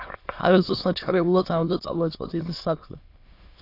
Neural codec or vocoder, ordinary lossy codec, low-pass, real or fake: autoencoder, 22.05 kHz, a latent of 192 numbers a frame, VITS, trained on many speakers; AAC, 32 kbps; 5.4 kHz; fake